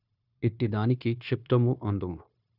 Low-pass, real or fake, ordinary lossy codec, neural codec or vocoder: 5.4 kHz; fake; none; codec, 16 kHz, 0.9 kbps, LongCat-Audio-Codec